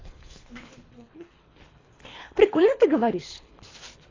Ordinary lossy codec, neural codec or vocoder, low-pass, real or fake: AAC, 32 kbps; codec, 24 kHz, 3 kbps, HILCodec; 7.2 kHz; fake